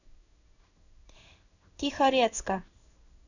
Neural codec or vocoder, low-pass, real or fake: codec, 16 kHz in and 24 kHz out, 1 kbps, XY-Tokenizer; 7.2 kHz; fake